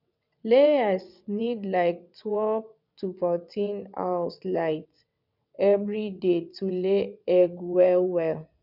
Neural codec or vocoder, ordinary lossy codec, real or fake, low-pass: vocoder, 22.05 kHz, 80 mel bands, WaveNeXt; none; fake; 5.4 kHz